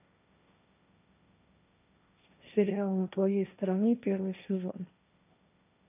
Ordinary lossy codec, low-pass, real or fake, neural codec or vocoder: none; 3.6 kHz; fake; codec, 16 kHz, 1.1 kbps, Voila-Tokenizer